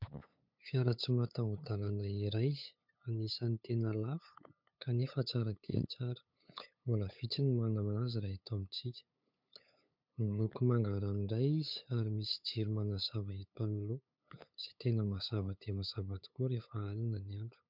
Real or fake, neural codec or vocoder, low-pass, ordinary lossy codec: fake; codec, 16 kHz, 8 kbps, FunCodec, trained on LibriTTS, 25 frames a second; 5.4 kHz; MP3, 48 kbps